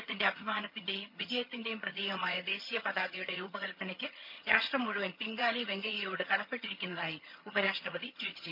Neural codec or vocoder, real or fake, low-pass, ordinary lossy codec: vocoder, 22.05 kHz, 80 mel bands, HiFi-GAN; fake; 5.4 kHz; none